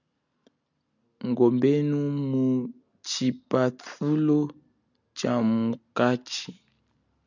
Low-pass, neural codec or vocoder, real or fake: 7.2 kHz; none; real